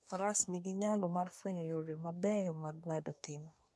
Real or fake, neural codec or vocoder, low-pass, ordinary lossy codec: fake; codec, 24 kHz, 1 kbps, SNAC; none; none